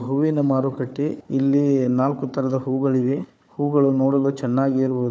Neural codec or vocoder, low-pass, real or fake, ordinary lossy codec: codec, 16 kHz, 4 kbps, FunCodec, trained on Chinese and English, 50 frames a second; none; fake; none